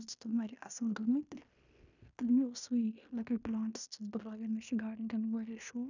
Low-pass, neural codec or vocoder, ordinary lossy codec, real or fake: 7.2 kHz; codec, 16 kHz in and 24 kHz out, 0.9 kbps, LongCat-Audio-Codec, fine tuned four codebook decoder; none; fake